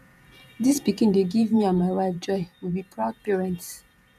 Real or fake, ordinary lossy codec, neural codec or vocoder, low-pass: fake; none; vocoder, 48 kHz, 128 mel bands, Vocos; 14.4 kHz